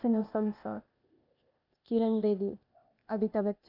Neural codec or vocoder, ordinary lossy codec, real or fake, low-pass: codec, 16 kHz, 0.8 kbps, ZipCodec; none; fake; 5.4 kHz